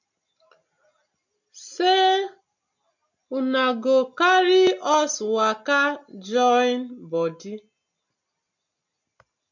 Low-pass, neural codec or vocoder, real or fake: 7.2 kHz; none; real